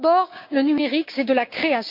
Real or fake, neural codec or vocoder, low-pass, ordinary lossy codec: fake; codec, 16 kHz, 6 kbps, DAC; 5.4 kHz; MP3, 48 kbps